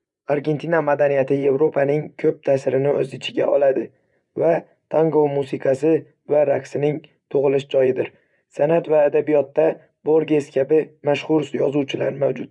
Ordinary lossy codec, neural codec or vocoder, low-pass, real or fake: none; vocoder, 24 kHz, 100 mel bands, Vocos; 10.8 kHz; fake